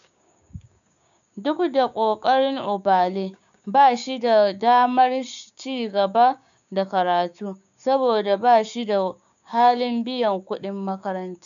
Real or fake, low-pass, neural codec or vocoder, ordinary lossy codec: fake; 7.2 kHz; codec, 16 kHz, 6 kbps, DAC; none